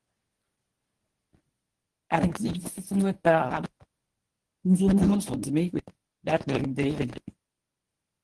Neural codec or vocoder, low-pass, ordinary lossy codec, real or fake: codec, 24 kHz, 0.9 kbps, WavTokenizer, medium speech release version 1; 10.8 kHz; Opus, 16 kbps; fake